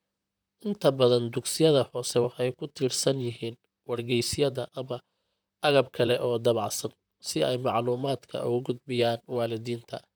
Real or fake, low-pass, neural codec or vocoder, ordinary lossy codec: fake; none; vocoder, 44.1 kHz, 128 mel bands, Pupu-Vocoder; none